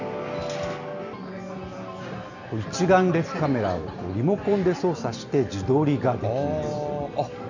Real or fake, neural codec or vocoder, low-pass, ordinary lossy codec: real; none; 7.2 kHz; none